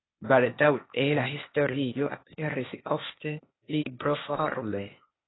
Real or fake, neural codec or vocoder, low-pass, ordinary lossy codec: fake; codec, 16 kHz, 0.8 kbps, ZipCodec; 7.2 kHz; AAC, 16 kbps